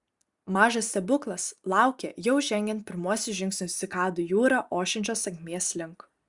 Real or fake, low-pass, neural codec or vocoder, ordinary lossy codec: real; 10.8 kHz; none; Opus, 64 kbps